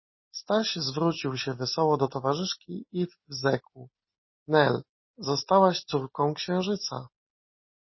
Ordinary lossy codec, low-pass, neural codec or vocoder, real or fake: MP3, 24 kbps; 7.2 kHz; none; real